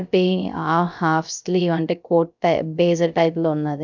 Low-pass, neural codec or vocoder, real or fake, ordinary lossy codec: 7.2 kHz; codec, 16 kHz, 0.3 kbps, FocalCodec; fake; none